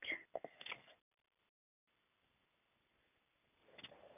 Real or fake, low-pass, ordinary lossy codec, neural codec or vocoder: real; 3.6 kHz; none; none